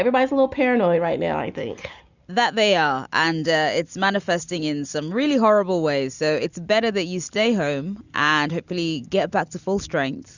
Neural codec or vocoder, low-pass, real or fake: none; 7.2 kHz; real